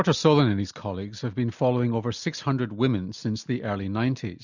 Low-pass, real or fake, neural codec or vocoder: 7.2 kHz; real; none